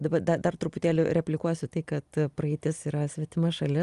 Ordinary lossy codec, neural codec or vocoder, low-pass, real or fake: Opus, 32 kbps; none; 10.8 kHz; real